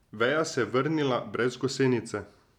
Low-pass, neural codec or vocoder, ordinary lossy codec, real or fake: 19.8 kHz; none; none; real